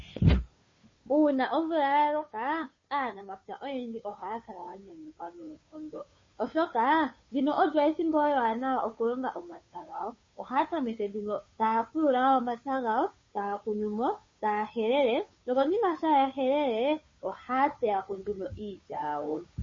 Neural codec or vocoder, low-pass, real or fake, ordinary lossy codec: codec, 16 kHz, 2 kbps, FunCodec, trained on Chinese and English, 25 frames a second; 7.2 kHz; fake; MP3, 32 kbps